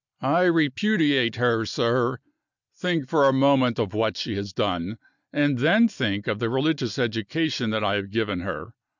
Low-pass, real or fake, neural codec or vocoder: 7.2 kHz; real; none